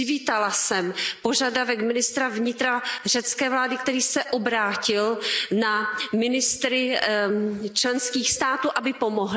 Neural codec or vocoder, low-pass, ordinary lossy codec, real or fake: none; none; none; real